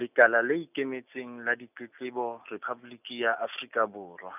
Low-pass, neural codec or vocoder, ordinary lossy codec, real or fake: 3.6 kHz; none; none; real